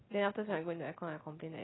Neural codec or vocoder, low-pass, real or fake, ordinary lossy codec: none; 7.2 kHz; real; AAC, 16 kbps